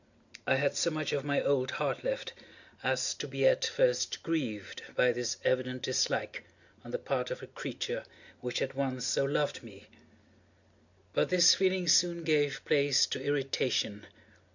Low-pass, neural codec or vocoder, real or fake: 7.2 kHz; none; real